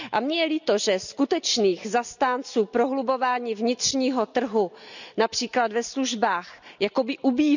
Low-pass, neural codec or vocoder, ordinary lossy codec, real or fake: 7.2 kHz; none; none; real